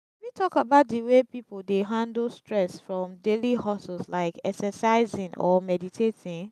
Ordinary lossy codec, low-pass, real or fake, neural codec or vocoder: none; 14.4 kHz; real; none